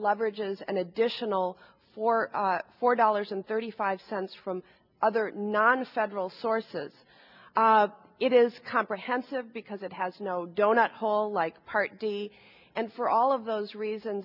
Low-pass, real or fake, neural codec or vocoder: 5.4 kHz; real; none